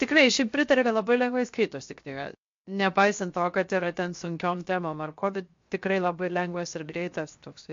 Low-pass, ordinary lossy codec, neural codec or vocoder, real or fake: 7.2 kHz; MP3, 48 kbps; codec, 16 kHz, 0.7 kbps, FocalCodec; fake